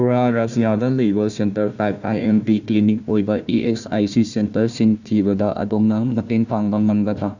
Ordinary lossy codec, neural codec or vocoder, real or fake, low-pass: Opus, 64 kbps; codec, 16 kHz, 1 kbps, FunCodec, trained on Chinese and English, 50 frames a second; fake; 7.2 kHz